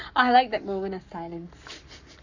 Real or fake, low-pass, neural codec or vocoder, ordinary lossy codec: fake; 7.2 kHz; codec, 44.1 kHz, 7.8 kbps, Pupu-Codec; none